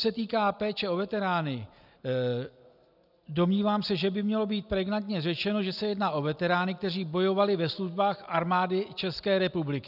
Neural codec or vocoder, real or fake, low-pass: none; real; 5.4 kHz